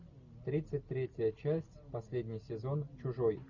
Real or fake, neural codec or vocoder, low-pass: real; none; 7.2 kHz